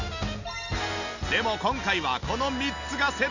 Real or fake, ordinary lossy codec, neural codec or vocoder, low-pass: real; none; none; 7.2 kHz